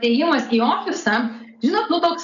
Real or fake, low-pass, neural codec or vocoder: real; 7.2 kHz; none